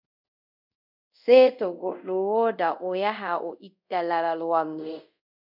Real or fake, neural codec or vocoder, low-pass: fake; codec, 24 kHz, 0.5 kbps, DualCodec; 5.4 kHz